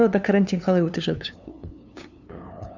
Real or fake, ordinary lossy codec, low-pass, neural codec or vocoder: fake; none; 7.2 kHz; codec, 16 kHz, 2 kbps, FunCodec, trained on LibriTTS, 25 frames a second